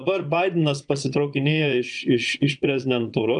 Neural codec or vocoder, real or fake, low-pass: vocoder, 22.05 kHz, 80 mel bands, Vocos; fake; 9.9 kHz